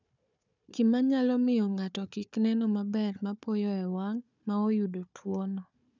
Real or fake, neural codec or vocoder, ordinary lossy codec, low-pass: fake; codec, 16 kHz, 4 kbps, FunCodec, trained on Chinese and English, 50 frames a second; none; 7.2 kHz